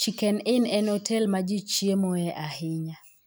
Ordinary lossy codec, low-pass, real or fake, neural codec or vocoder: none; none; real; none